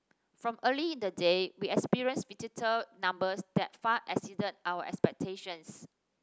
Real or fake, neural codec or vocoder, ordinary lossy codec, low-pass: real; none; none; none